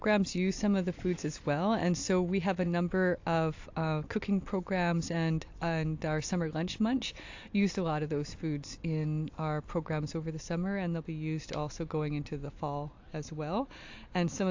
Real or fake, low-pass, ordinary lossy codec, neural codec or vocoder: real; 7.2 kHz; AAC, 48 kbps; none